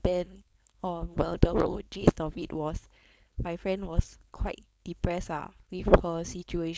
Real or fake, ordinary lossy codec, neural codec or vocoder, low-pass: fake; none; codec, 16 kHz, 4.8 kbps, FACodec; none